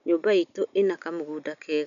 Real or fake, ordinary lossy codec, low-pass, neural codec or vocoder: real; none; 7.2 kHz; none